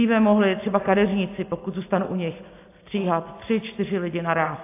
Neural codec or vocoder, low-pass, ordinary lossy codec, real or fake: none; 3.6 kHz; AAC, 24 kbps; real